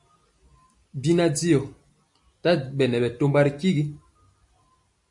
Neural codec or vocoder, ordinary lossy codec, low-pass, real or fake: none; MP3, 48 kbps; 10.8 kHz; real